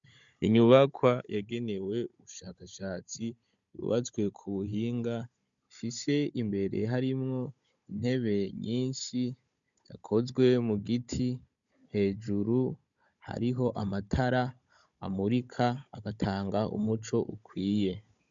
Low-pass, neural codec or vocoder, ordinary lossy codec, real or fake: 7.2 kHz; codec, 16 kHz, 16 kbps, FunCodec, trained on Chinese and English, 50 frames a second; MP3, 64 kbps; fake